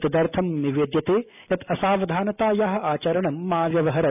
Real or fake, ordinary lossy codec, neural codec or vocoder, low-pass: real; none; none; 3.6 kHz